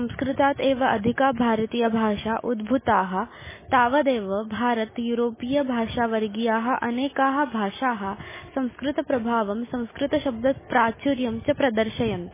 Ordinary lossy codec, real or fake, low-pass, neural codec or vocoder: MP3, 16 kbps; real; 3.6 kHz; none